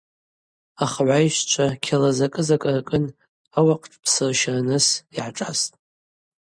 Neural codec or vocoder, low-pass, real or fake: none; 9.9 kHz; real